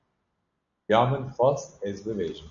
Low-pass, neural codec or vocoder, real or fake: 7.2 kHz; none; real